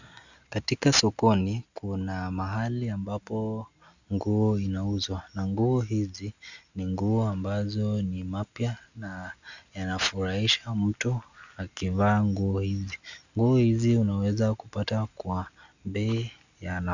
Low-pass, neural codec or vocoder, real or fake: 7.2 kHz; none; real